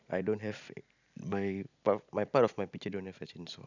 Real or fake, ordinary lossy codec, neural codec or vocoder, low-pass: real; none; none; 7.2 kHz